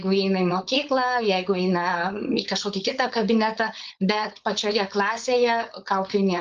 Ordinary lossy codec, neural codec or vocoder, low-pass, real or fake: Opus, 32 kbps; codec, 16 kHz, 4.8 kbps, FACodec; 7.2 kHz; fake